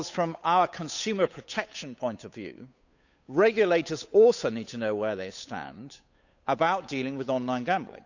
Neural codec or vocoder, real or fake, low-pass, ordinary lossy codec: codec, 16 kHz, 8 kbps, FunCodec, trained on Chinese and English, 25 frames a second; fake; 7.2 kHz; none